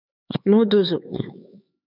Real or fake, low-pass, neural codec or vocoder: fake; 5.4 kHz; codec, 16 kHz, 4 kbps, X-Codec, HuBERT features, trained on LibriSpeech